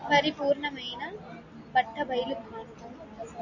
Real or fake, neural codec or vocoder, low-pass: real; none; 7.2 kHz